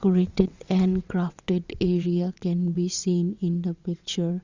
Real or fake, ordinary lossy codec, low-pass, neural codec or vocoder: fake; Opus, 64 kbps; 7.2 kHz; vocoder, 22.05 kHz, 80 mel bands, WaveNeXt